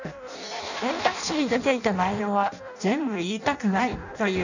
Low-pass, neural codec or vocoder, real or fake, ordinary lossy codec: 7.2 kHz; codec, 16 kHz in and 24 kHz out, 0.6 kbps, FireRedTTS-2 codec; fake; none